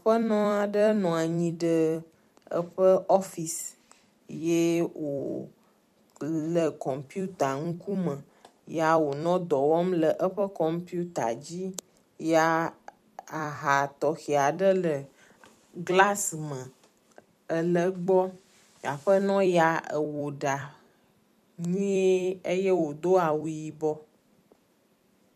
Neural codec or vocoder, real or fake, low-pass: vocoder, 44.1 kHz, 128 mel bands every 256 samples, BigVGAN v2; fake; 14.4 kHz